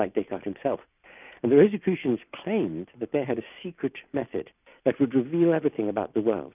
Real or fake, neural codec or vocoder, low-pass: real; none; 3.6 kHz